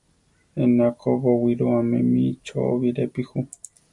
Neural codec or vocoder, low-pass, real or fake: none; 10.8 kHz; real